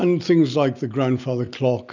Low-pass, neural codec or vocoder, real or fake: 7.2 kHz; none; real